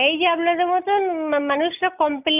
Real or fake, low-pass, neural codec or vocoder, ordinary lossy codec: real; 3.6 kHz; none; none